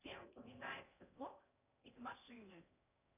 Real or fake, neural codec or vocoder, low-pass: fake; codec, 16 kHz in and 24 kHz out, 0.6 kbps, FocalCodec, streaming, 4096 codes; 3.6 kHz